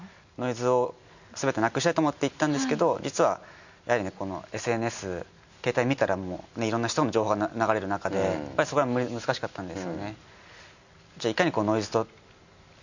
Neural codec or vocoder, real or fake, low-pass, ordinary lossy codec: none; real; 7.2 kHz; none